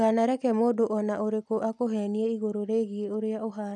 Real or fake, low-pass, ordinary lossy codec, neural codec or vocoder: real; none; none; none